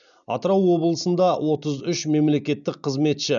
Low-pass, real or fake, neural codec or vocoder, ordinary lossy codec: 7.2 kHz; real; none; none